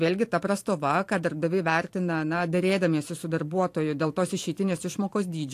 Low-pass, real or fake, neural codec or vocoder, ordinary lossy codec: 14.4 kHz; fake; vocoder, 44.1 kHz, 128 mel bands every 512 samples, BigVGAN v2; AAC, 64 kbps